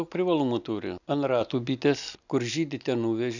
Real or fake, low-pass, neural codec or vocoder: real; 7.2 kHz; none